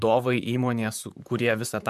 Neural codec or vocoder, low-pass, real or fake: vocoder, 44.1 kHz, 128 mel bands, Pupu-Vocoder; 14.4 kHz; fake